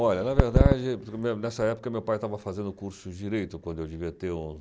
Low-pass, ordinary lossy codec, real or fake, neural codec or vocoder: none; none; real; none